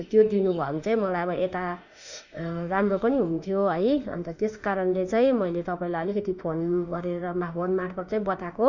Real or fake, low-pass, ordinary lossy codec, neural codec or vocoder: fake; 7.2 kHz; none; autoencoder, 48 kHz, 32 numbers a frame, DAC-VAE, trained on Japanese speech